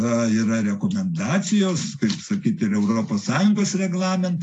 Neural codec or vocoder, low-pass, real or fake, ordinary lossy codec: none; 10.8 kHz; real; AAC, 48 kbps